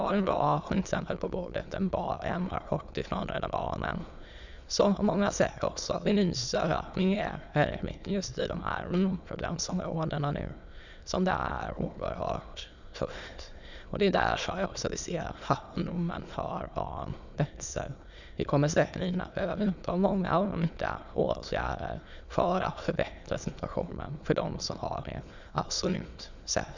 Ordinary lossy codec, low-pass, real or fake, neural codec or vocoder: none; 7.2 kHz; fake; autoencoder, 22.05 kHz, a latent of 192 numbers a frame, VITS, trained on many speakers